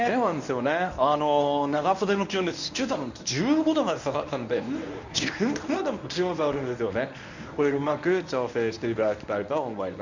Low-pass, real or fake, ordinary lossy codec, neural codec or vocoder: 7.2 kHz; fake; none; codec, 24 kHz, 0.9 kbps, WavTokenizer, medium speech release version 1